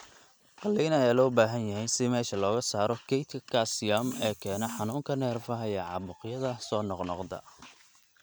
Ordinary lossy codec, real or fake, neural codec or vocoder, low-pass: none; real; none; none